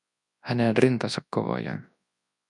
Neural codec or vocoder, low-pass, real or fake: codec, 24 kHz, 0.9 kbps, WavTokenizer, large speech release; 10.8 kHz; fake